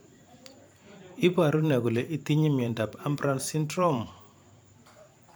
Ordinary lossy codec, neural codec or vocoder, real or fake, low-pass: none; vocoder, 44.1 kHz, 128 mel bands every 512 samples, BigVGAN v2; fake; none